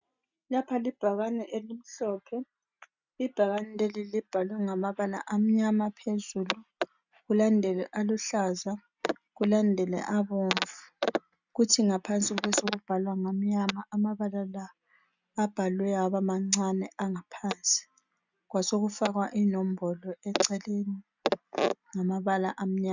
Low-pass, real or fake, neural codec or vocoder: 7.2 kHz; real; none